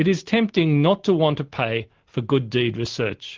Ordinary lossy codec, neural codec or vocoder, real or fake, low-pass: Opus, 16 kbps; none; real; 7.2 kHz